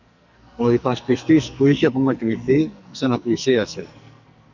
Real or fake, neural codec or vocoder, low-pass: fake; codec, 44.1 kHz, 2.6 kbps, SNAC; 7.2 kHz